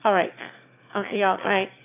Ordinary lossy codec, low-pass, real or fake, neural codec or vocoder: none; 3.6 kHz; fake; autoencoder, 22.05 kHz, a latent of 192 numbers a frame, VITS, trained on one speaker